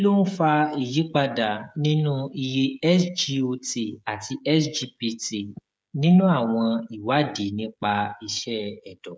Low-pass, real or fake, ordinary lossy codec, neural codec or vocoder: none; fake; none; codec, 16 kHz, 16 kbps, FreqCodec, smaller model